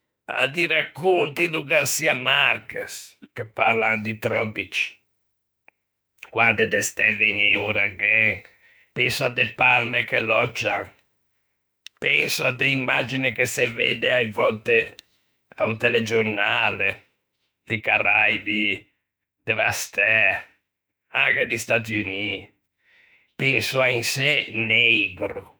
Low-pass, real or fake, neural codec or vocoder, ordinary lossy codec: none; fake; autoencoder, 48 kHz, 32 numbers a frame, DAC-VAE, trained on Japanese speech; none